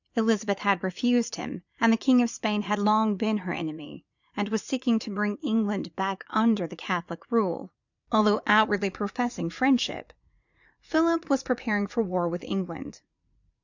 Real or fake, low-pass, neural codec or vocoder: fake; 7.2 kHz; vocoder, 44.1 kHz, 80 mel bands, Vocos